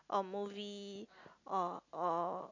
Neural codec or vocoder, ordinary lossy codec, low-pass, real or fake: none; none; 7.2 kHz; real